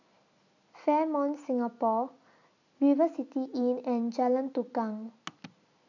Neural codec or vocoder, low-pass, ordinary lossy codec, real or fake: none; 7.2 kHz; none; real